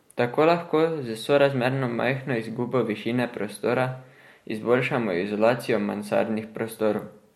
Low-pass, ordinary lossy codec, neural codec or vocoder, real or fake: 19.8 kHz; MP3, 64 kbps; none; real